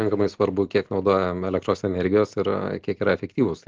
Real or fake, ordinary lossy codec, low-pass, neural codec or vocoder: real; Opus, 16 kbps; 7.2 kHz; none